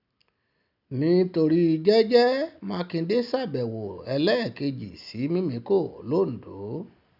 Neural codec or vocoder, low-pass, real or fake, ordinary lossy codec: none; 5.4 kHz; real; none